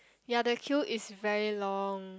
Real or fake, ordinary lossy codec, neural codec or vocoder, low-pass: real; none; none; none